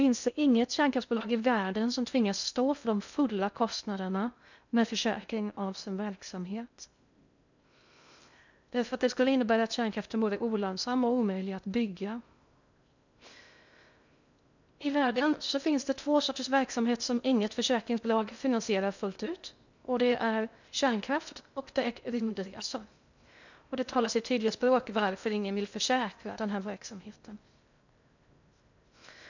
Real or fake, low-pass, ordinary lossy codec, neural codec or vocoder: fake; 7.2 kHz; none; codec, 16 kHz in and 24 kHz out, 0.6 kbps, FocalCodec, streaming, 2048 codes